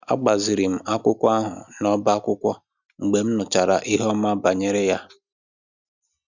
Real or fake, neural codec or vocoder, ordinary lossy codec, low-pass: real; none; none; 7.2 kHz